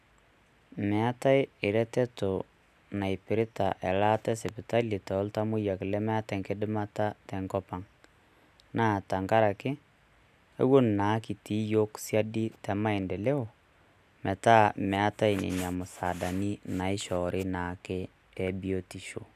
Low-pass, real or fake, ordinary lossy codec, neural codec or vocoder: 14.4 kHz; real; none; none